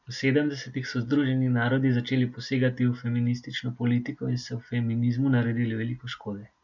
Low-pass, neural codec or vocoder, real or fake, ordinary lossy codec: 7.2 kHz; none; real; none